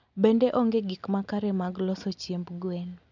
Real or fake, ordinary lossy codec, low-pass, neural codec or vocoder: real; none; 7.2 kHz; none